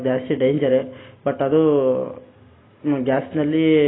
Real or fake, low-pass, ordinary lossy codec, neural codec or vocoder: real; 7.2 kHz; AAC, 16 kbps; none